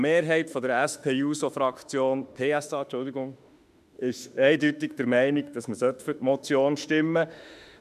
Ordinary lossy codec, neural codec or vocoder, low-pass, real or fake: none; autoencoder, 48 kHz, 32 numbers a frame, DAC-VAE, trained on Japanese speech; 14.4 kHz; fake